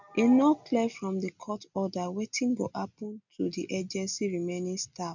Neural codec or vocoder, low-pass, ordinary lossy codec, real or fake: none; 7.2 kHz; none; real